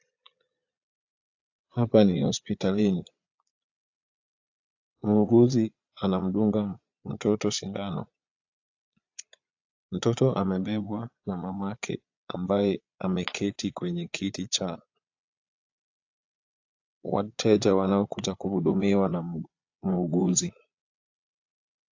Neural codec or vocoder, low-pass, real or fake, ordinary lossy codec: vocoder, 22.05 kHz, 80 mel bands, Vocos; 7.2 kHz; fake; AAC, 48 kbps